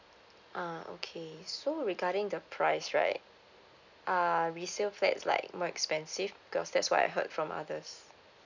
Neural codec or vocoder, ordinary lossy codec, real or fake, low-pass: none; none; real; 7.2 kHz